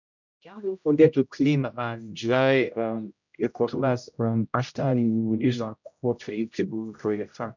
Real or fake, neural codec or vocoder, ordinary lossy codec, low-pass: fake; codec, 16 kHz, 0.5 kbps, X-Codec, HuBERT features, trained on general audio; none; 7.2 kHz